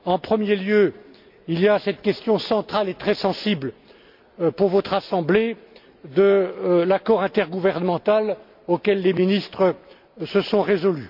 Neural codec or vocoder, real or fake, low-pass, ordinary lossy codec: none; real; 5.4 kHz; MP3, 48 kbps